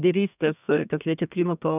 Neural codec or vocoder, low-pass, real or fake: codec, 32 kHz, 1.9 kbps, SNAC; 3.6 kHz; fake